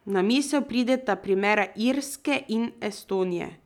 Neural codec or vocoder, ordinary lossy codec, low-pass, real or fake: none; none; 19.8 kHz; real